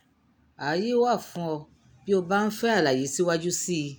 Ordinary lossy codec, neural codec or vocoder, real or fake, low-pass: none; none; real; none